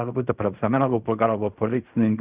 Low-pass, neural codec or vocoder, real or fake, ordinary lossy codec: 3.6 kHz; codec, 16 kHz in and 24 kHz out, 0.4 kbps, LongCat-Audio-Codec, fine tuned four codebook decoder; fake; Opus, 64 kbps